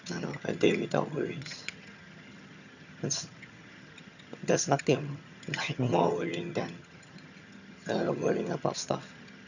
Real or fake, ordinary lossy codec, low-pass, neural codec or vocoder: fake; none; 7.2 kHz; vocoder, 22.05 kHz, 80 mel bands, HiFi-GAN